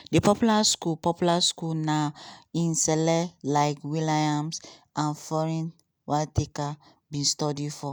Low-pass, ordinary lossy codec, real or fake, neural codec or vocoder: none; none; real; none